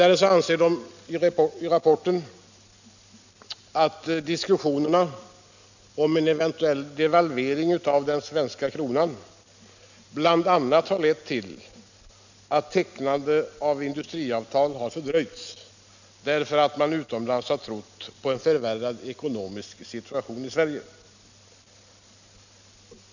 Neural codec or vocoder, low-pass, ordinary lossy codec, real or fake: none; 7.2 kHz; none; real